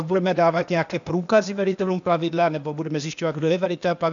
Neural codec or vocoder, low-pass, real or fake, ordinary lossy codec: codec, 16 kHz, 0.8 kbps, ZipCodec; 7.2 kHz; fake; MP3, 96 kbps